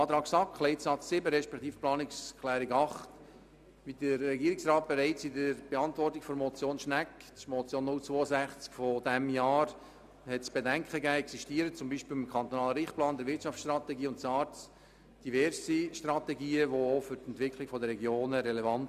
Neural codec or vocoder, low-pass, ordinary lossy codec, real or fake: none; 14.4 kHz; none; real